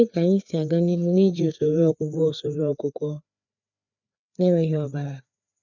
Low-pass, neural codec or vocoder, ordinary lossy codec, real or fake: 7.2 kHz; codec, 16 kHz, 4 kbps, FreqCodec, larger model; none; fake